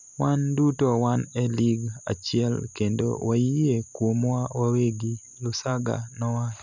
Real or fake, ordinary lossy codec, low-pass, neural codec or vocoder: fake; none; 7.2 kHz; vocoder, 44.1 kHz, 128 mel bands every 256 samples, BigVGAN v2